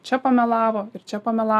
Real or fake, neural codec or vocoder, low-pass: real; none; 14.4 kHz